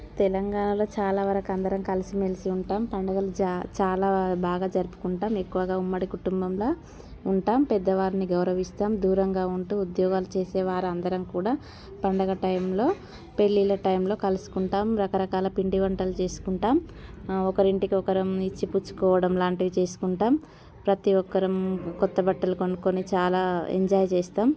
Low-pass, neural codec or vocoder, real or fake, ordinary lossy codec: none; none; real; none